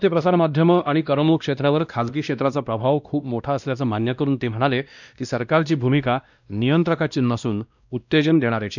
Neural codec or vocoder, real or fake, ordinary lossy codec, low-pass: codec, 16 kHz, 1 kbps, X-Codec, WavLM features, trained on Multilingual LibriSpeech; fake; none; 7.2 kHz